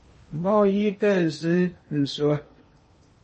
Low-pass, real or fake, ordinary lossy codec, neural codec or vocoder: 10.8 kHz; fake; MP3, 32 kbps; codec, 16 kHz in and 24 kHz out, 0.8 kbps, FocalCodec, streaming, 65536 codes